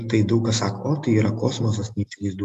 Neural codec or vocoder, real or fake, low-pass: none; real; 14.4 kHz